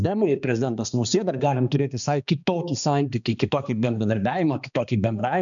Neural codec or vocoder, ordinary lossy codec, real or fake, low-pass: codec, 16 kHz, 2 kbps, X-Codec, HuBERT features, trained on balanced general audio; MP3, 96 kbps; fake; 7.2 kHz